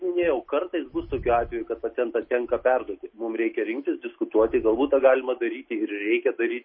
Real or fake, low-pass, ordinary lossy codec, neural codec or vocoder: real; 7.2 kHz; MP3, 24 kbps; none